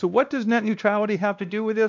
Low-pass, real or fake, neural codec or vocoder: 7.2 kHz; fake; codec, 16 kHz, 1 kbps, X-Codec, WavLM features, trained on Multilingual LibriSpeech